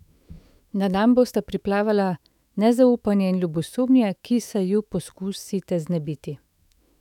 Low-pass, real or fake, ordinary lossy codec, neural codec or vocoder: 19.8 kHz; fake; none; autoencoder, 48 kHz, 128 numbers a frame, DAC-VAE, trained on Japanese speech